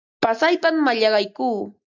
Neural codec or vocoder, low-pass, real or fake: none; 7.2 kHz; real